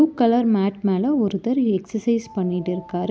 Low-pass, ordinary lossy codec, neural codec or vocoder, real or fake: none; none; none; real